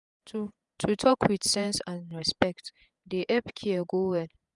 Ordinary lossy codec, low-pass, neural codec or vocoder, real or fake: none; 10.8 kHz; vocoder, 48 kHz, 128 mel bands, Vocos; fake